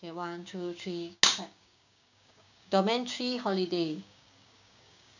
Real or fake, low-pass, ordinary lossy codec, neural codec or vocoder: fake; 7.2 kHz; none; codec, 16 kHz, 6 kbps, DAC